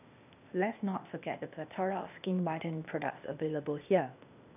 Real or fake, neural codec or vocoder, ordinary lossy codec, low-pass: fake; codec, 16 kHz, 0.8 kbps, ZipCodec; none; 3.6 kHz